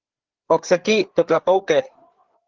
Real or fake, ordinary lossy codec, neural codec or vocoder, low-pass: fake; Opus, 16 kbps; codec, 16 kHz, 4 kbps, FreqCodec, larger model; 7.2 kHz